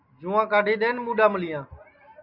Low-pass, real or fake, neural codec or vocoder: 5.4 kHz; real; none